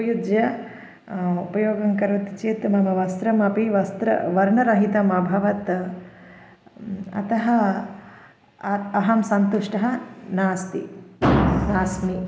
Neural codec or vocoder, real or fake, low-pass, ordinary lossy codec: none; real; none; none